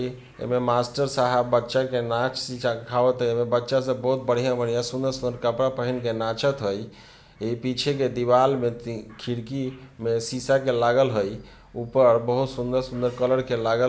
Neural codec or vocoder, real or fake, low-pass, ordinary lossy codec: none; real; none; none